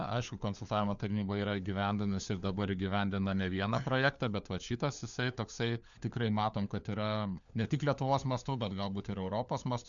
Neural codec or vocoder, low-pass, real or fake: codec, 16 kHz, 4 kbps, FunCodec, trained on LibriTTS, 50 frames a second; 7.2 kHz; fake